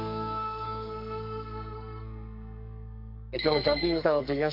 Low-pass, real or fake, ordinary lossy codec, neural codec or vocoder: 5.4 kHz; fake; none; codec, 44.1 kHz, 2.6 kbps, SNAC